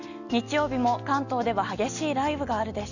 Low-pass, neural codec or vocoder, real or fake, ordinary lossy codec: 7.2 kHz; none; real; none